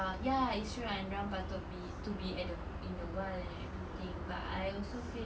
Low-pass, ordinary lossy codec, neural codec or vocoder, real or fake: none; none; none; real